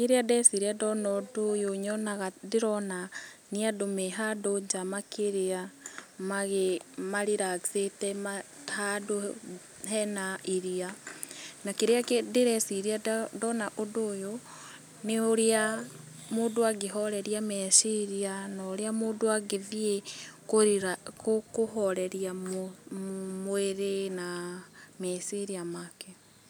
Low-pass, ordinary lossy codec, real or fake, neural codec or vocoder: none; none; real; none